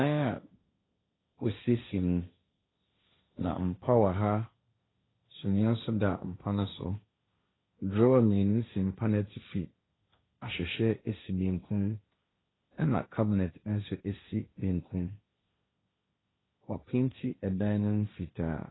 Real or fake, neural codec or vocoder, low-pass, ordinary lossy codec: fake; codec, 16 kHz, 1.1 kbps, Voila-Tokenizer; 7.2 kHz; AAC, 16 kbps